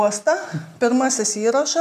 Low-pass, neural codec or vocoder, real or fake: 14.4 kHz; none; real